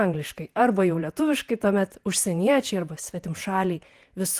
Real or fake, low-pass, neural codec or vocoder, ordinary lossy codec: fake; 14.4 kHz; vocoder, 48 kHz, 128 mel bands, Vocos; Opus, 24 kbps